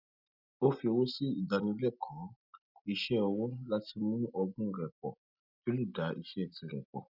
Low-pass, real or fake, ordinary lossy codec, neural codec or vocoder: 5.4 kHz; real; none; none